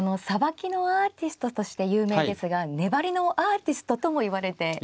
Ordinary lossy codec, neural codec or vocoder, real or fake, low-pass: none; none; real; none